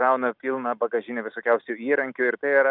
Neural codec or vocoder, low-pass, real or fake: none; 5.4 kHz; real